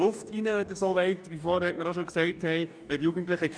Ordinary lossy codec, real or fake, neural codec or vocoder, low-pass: none; fake; codec, 44.1 kHz, 2.6 kbps, DAC; 9.9 kHz